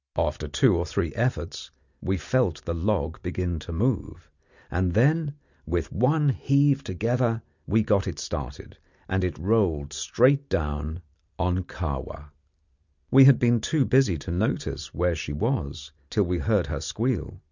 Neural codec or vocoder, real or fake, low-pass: none; real; 7.2 kHz